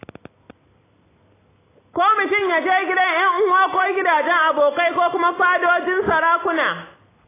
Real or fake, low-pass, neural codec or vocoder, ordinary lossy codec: real; 3.6 kHz; none; AAC, 16 kbps